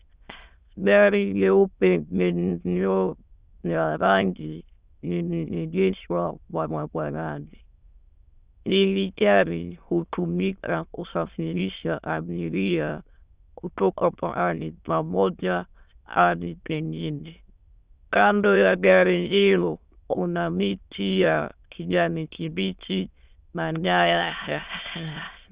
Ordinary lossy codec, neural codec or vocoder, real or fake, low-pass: Opus, 64 kbps; autoencoder, 22.05 kHz, a latent of 192 numbers a frame, VITS, trained on many speakers; fake; 3.6 kHz